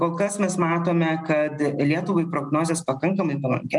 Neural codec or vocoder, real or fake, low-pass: none; real; 10.8 kHz